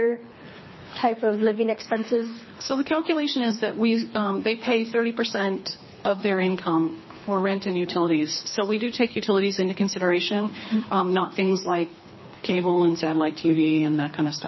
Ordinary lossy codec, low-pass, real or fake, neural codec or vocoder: MP3, 24 kbps; 7.2 kHz; fake; codec, 24 kHz, 3 kbps, HILCodec